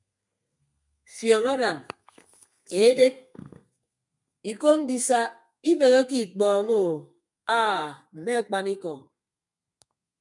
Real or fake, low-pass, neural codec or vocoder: fake; 10.8 kHz; codec, 32 kHz, 1.9 kbps, SNAC